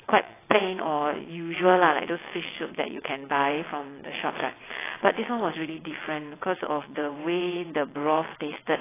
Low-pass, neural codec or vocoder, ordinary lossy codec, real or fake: 3.6 kHz; vocoder, 22.05 kHz, 80 mel bands, WaveNeXt; AAC, 16 kbps; fake